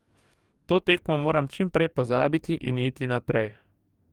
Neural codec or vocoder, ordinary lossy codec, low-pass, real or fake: codec, 44.1 kHz, 2.6 kbps, DAC; Opus, 32 kbps; 19.8 kHz; fake